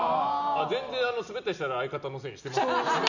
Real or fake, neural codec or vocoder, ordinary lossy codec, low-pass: real; none; none; 7.2 kHz